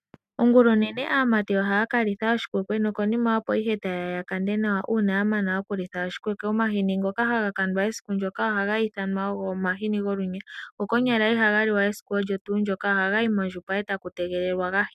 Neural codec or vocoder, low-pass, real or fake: none; 14.4 kHz; real